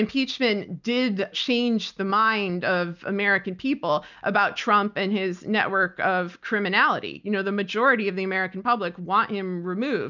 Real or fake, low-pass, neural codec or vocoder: real; 7.2 kHz; none